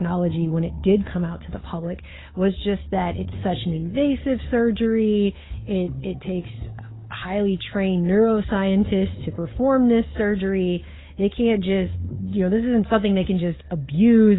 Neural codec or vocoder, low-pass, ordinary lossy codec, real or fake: codec, 16 kHz, 4 kbps, FunCodec, trained on Chinese and English, 50 frames a second; 7.2 kHz; AAC, 16 kbps; fake